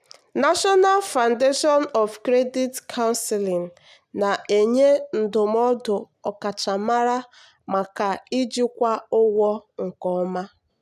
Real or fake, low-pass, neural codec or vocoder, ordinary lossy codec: real; 14.4 kHz; none; none